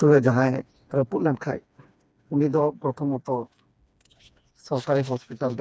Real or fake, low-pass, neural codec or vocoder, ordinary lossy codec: fake; none; codec, 16 kHz, 2 kbps, FreqCodec, smaller model; none